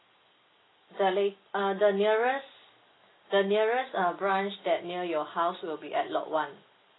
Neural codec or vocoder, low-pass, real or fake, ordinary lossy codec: none; 7.2 kHz; real; AAC, 16 kbps